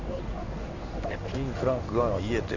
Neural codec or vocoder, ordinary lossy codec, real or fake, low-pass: codec, 16 kHz in and 24 kHz out, 1 kbps, XY-Tokenizer; none; fake; 7.2 kHz